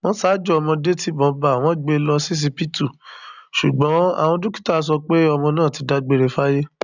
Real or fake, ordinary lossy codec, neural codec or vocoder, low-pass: real; none; none; 7.2 kHz